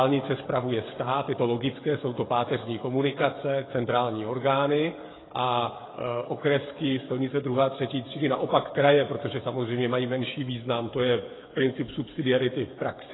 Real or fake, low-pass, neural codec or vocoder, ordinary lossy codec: fake; 7.2 kHz; codec, 16 kHz, 16 kbps, FreqCodec, smaller model; AAC, 16 kbps